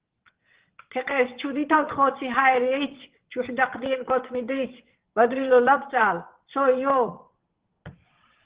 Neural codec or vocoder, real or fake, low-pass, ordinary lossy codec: vocoder, 22.05 kHz, 80 mel bands, WaveNeXt; fake; 3.6 kHz; Opus, 16 kbps